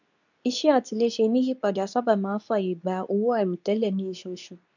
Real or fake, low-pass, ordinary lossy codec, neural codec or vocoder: fake; 7.2 kHz; none; codec, 24 kHz, 0.9 kbps, WavTokenizer, medium speech release version 2